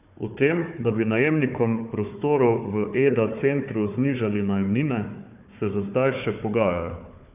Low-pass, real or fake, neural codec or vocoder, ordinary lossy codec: 3.6 kHz; fake; codec, 16 kHz, 4 kbps, FunCodec, trained on Chinese and English, 50 frames a second; none